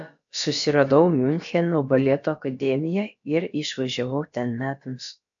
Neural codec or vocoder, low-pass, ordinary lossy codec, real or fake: codec, 16 kHz, about 1 kbps, DyCAST, with the encoder's durations; 7.2 kHz; AAC, 64 kbps; fake